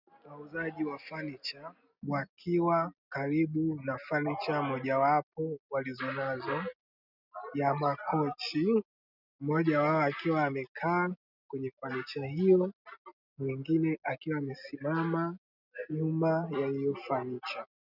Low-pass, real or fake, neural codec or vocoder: 5.4 kHz; real; none